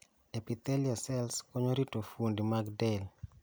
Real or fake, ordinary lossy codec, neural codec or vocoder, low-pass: real; none; none; none